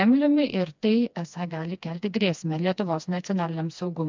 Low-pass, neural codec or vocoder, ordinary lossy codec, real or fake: 7.2 kHz; codec, 16 kHz, 2 kbps, FreqCodec, smaller model; MP3, 64 kbps; fake